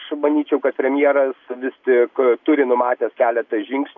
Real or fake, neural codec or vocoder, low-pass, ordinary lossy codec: real; none; 7.2 kHz; AAC, 48 kbps